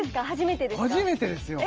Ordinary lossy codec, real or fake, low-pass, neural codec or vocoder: Opus, 24 kbps; real; 7.2 kHz; none